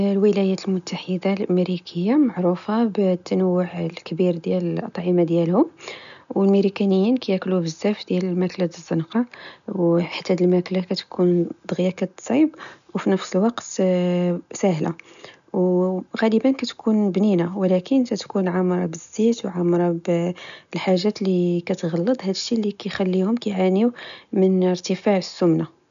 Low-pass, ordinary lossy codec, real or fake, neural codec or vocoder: 7.2 kHz; none; real; none